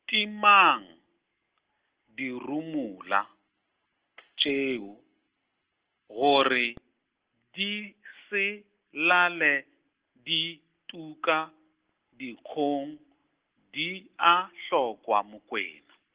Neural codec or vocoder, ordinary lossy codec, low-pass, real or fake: none; Opus, 16 kbps; 3.6 kHz; real